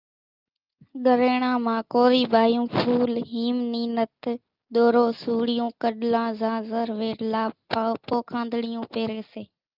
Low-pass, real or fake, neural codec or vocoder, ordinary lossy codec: 5.4 kHz; real; none; Opus, 24 kbps